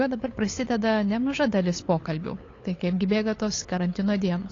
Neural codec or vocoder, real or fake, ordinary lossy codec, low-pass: codec, 16 kHz, 8 kbps, FunCodec, trained on LibriTTS, 25 frames a second; fake; AAC, 32 kbps; 7.2 kHz